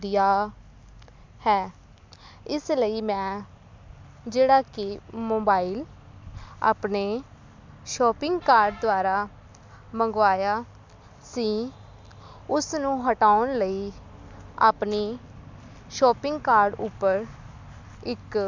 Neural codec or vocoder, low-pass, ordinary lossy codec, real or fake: none; 7.2 kHz; MP3, 64 kbps; real